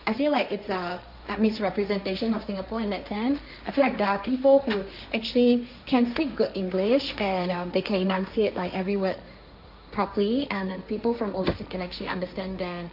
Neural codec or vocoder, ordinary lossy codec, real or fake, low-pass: codec, 16 kHz, 1.1 kbps, Voila-Tokenizer; none; fake; 5.4 kHz